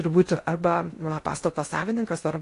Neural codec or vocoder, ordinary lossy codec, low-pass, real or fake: codec, 16 kHz in and 24 kHz out, 0.6 kbps, FocalCodec, streaming, 2048 codes; AAC, 48 kbps; 10.8 kHz; fake